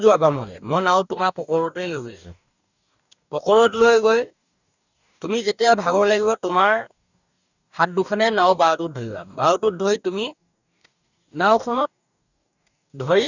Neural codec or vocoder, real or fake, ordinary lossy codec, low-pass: codec, 44.1 kHz, 2.6 kbps, DAC; fake; none; 7.2 kHz